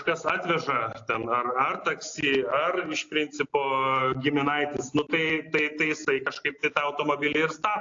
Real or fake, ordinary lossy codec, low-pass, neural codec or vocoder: real; AAC, 48 kbps; 7.2 kHz; none